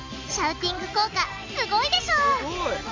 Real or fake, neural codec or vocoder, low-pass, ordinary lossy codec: real; none; 7.2 kHz; MP3, 64 kbps